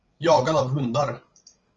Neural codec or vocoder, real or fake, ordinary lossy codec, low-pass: codec, 16 kHz, 16 kbps, FreqCodec, larger model; fake; Opus, 32 kbps; 7.2 kHz